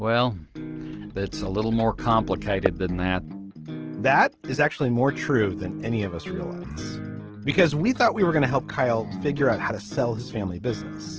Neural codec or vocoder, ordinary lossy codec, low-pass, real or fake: none; Opus, 16 kbps; 7.2 kHz; real